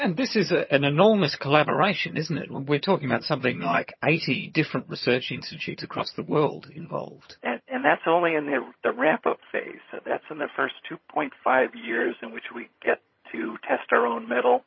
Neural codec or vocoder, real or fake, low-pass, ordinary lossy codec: vocoder, 22.05 kHz, 80 mel bands, HiFi-GAN; fake; 7.2 kHz; MP3, 24 kbps